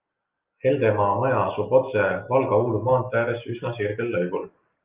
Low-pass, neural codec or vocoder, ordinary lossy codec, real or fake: 3.6 kHz; none; Opus, 32 kbps; real